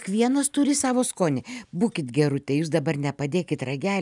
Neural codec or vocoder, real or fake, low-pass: none; real; 10.8 kHz